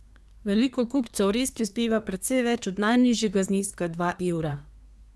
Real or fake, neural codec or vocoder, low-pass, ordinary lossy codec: fake; codec, 24 kHz, 1 kbps, SNAC; none; none